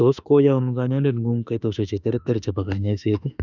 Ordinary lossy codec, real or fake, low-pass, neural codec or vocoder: none; fake; 7.2 kHz; autoencoder, 48 kHz, 32 numbers a frame, DAC-VAE, trained on Japanese speech